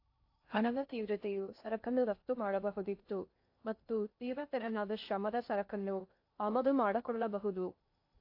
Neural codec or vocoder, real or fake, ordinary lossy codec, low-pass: codec, 16 kHz in and 24 kHz out, 0.6 kbps, FocalCodec, streaming, 2048 codes; fake; AAC, 32 kbps; 5.4 kHz